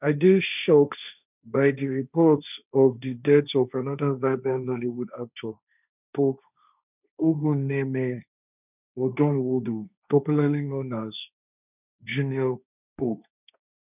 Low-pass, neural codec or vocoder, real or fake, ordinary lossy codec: 3.6 kHz; codec, 16 kHz, 1.1 kbps, Voila-Tokenizer; fake; none